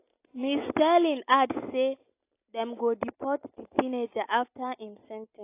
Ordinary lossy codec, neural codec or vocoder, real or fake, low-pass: AAC, 24 kbps; none; real; 3.6 kHz